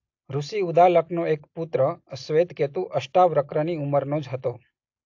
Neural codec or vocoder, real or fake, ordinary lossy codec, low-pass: none; real; AAC, 48 kbps; 7.2 kHz